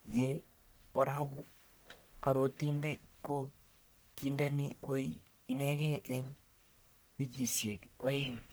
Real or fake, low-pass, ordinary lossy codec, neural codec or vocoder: fake; none; none; codec, 44.1 kHz, 1.7 kbps, Pupu-Codec